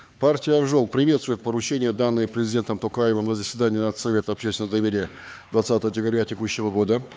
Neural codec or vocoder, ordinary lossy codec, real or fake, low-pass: codec, 16 kHz, 4 kbps, X-Codec, HuBERT features, trained on LibriSpeech; none; fake; none